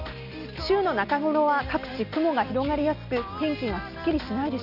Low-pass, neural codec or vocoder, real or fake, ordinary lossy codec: 5.4 kHz; none; real; none